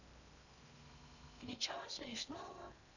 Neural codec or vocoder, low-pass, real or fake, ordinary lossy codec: codec, 16 kHz in and 24 kHz out, 0.6 kbps, FocalCodec, streaming, 2048 codes; 7.2 kHz; fake; none